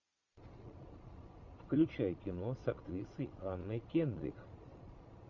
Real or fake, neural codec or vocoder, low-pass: fake; vocoder, 22.05 kHz, 80 mel bands, Vocos; 7.2 kHz